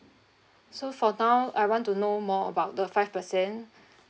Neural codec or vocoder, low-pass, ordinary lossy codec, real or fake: none; none; none; real